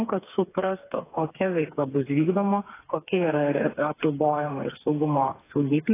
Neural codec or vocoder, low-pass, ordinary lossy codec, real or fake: codec, 16 kHz, 4 kbps, FreqCodec, smaller model; 3.6 kHz; AAC, 16 kbps; fake